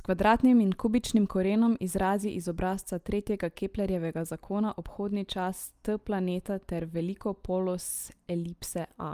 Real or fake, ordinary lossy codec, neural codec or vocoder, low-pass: real; Opus, 32 kbps; none; 14.4 kHz